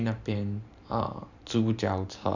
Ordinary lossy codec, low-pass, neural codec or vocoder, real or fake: none; 7.2 kHz; vocoder, 44.1 kHz, 128 mel bands every 512 samples, BigVGAN v2; fake